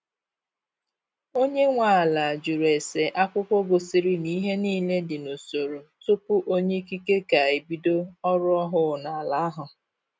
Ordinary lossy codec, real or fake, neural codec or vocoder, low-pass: none; real; none; none